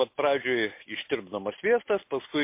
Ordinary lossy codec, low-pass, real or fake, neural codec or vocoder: MP3, 24 kbps; 3.6 kHz; real; none